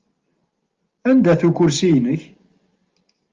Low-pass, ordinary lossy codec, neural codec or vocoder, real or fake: 7.2 kHz; Opus, 32 kbps; none; real